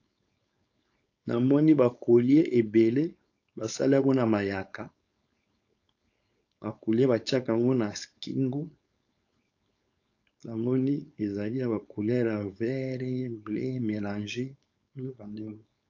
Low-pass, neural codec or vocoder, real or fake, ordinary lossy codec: 7.2 kHz; codec, 16 kHz, 4.8 kbps, FACodec; fake; AAC, 48 kbps